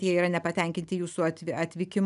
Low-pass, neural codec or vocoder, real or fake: 10.8 kHz; none; real